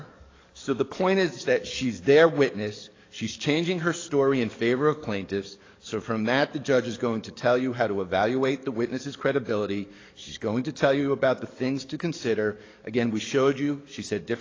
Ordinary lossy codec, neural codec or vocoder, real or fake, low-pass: AAC, 32 kbps; codec, 44.1 kHz, 7.8 kbps, DAC; fake; 7.2 kHz